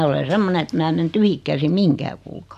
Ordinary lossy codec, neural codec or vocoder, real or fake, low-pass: AAC, 96 kbps; none; real; 14.4 kHz